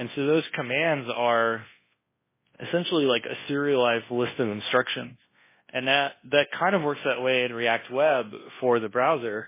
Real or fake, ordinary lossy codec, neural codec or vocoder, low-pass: fake; MP3, 16 kbps; codec, 24 kHz, 0.9 kbps, WavTokenizer, large speech release; 3.6 kHz